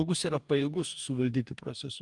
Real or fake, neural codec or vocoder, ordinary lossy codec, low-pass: fake; codec, 44.1 kHz, 2.6 kbps, DAC; Opus, 32 kbps; 10.8 kHz